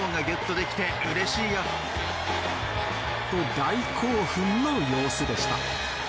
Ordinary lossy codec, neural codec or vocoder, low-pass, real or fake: none; none; none; real